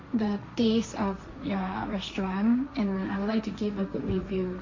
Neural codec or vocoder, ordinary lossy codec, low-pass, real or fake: codec, 16 kHz, 1.1 kbps, Voila-Tokenizer; MP3, 48 kbps; 7.2 kHz; fake